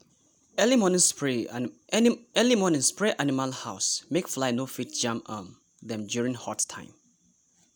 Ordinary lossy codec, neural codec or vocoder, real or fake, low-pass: none; none; real; none